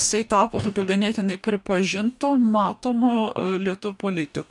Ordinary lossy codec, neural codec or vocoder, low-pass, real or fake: MP3, 96 kbps; codec, 44.1 kHz, 2.6 kbps, DAC; 10.8 kHz; fake